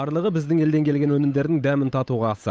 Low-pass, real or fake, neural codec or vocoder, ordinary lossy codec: none; fake; codec, 16 kHz, 8 kbps, FunCodec, trained on Chinese and English, 25 frames a second; none